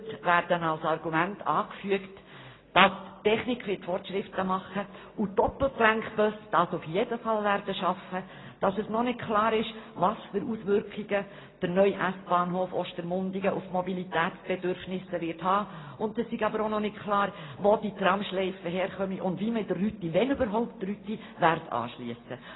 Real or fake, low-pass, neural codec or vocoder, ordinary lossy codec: real; 7.2 kHz; none; AAC, 16 kbps